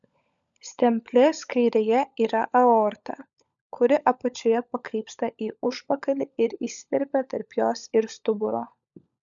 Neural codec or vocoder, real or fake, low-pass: codec, 16 kHz, 16 kbps, FunCodec, trained on LibriTTS, 50 frames a second; fake; 7.2 kHz